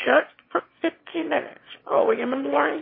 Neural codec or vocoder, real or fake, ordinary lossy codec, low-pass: autoencoder, 22.05 kHz, a latent of 192 numbers a frame, VITS, trained on one speaker; fake; MP3, 24 kbps; 5.4 kHz